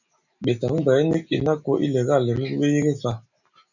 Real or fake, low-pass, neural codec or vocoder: real; 7.2 kHz; none